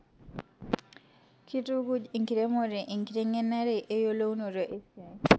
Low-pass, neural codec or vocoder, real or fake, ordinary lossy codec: none; none; real; none